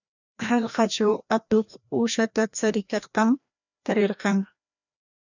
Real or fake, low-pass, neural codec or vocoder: fake; 7.2 kHz; codec, 16 kHz, 1 kbps, FreqCodec, larger model